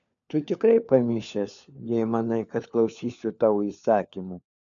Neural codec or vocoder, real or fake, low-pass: codec, 16 kHz, 4 kbps, FunCodec, trained on LibriTTS, 50 frames a second; fake; 7.2 kHz